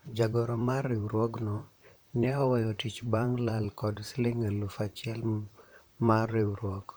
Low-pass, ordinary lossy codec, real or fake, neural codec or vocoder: none; none; fake; vocoder, 44.1 kHz, 128 mel bands, Pupu-Vocoder